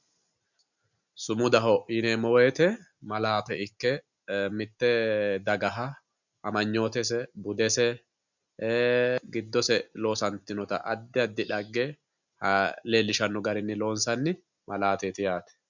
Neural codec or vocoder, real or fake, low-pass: none; real; 7.2 kHz